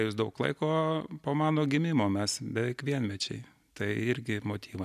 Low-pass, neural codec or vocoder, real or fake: 14.4 kHz; none; real